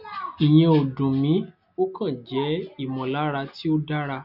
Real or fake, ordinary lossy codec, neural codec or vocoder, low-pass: real; none; none; 5.4 kHz